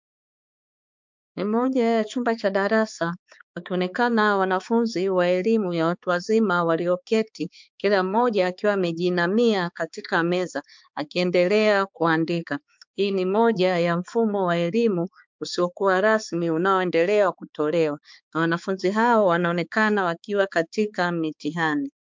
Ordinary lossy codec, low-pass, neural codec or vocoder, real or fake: MP3, 64 kbps; 7.2 kHz; codec, 16 kHz, 4 kbps, X-Codec, HuBERT features, trained on balanced general audio; fake